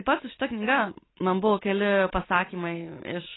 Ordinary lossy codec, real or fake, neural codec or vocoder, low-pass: AAC, 16 kbps; real; none; 7.2 kHz